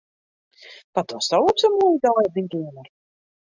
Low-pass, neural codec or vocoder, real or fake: 7.2 kHz; none; real